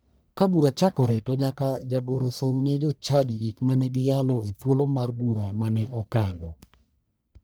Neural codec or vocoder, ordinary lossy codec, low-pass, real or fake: codec, 44.1 kHz, 1.7 kbps, Pupu-Codec; none; none; fake